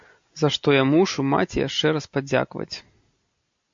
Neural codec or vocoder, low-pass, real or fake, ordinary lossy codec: none; 7.2 kHz; real; MP3, 48 kbps